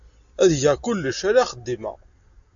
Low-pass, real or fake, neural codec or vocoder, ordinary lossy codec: 7.2 kHz; real; none; AAC, 64 kbps